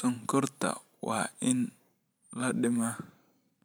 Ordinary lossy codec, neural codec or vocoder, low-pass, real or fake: none; vocoder, 44.1 kHz, 128 mel bands every 512 samples, BigVGAN v2; none; fake